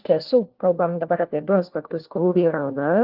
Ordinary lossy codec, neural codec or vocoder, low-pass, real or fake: Opus, 16 kbps; codec, 16 kHz, 1 kbps, FunCodec, trained on LibriTTS, 50 frames a second; 5.4 kHz; fake